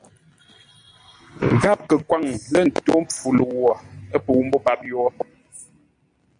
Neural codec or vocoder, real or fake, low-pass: none; real; 9.9 kHz